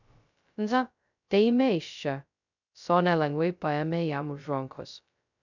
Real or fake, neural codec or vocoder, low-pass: fake; codec, 16 kHz, 0.2 kbps, FocalCodec; 7.2 kHz